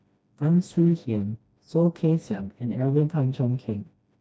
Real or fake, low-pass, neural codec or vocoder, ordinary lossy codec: fake; none; codec, 16 kHz, 1 kbps, FreqCodec, smaller model; none